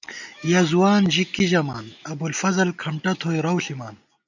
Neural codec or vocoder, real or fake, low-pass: none; real; 7.2 kHz